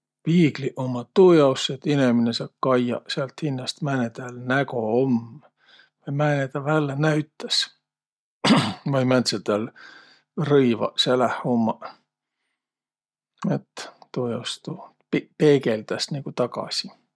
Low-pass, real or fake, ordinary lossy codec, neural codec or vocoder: none; real; none; none